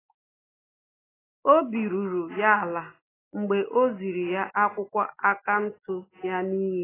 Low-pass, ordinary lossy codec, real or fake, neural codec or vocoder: 3.6 kHz; AAC, 16 kbps; real; none